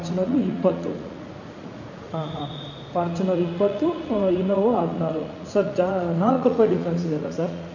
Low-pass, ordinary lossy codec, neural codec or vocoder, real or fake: 7.2 kHz; none; vocoder, 44.1 kHz, 80 mel bands, Vocos; fake